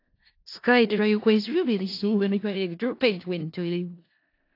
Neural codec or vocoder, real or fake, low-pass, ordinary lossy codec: codec, 16 kHz in and 24 kHz out, 0.4 kbps, LongCat-Audio-Codec, four codebook decoder; fake; 5.4 kHz; AAC, 32 kbps